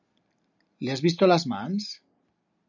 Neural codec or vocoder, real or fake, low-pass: none; real; 7.2 kHz